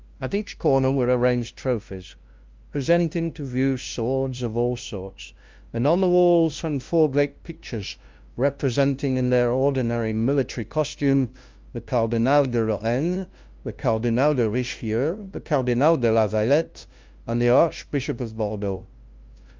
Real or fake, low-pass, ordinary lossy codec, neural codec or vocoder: fake; 7.2 kHz; Opus, 24 kbps; codec, 16 kHz, 0.5 kbps, FunCodec, trained on LibriTTS, 25 frames a second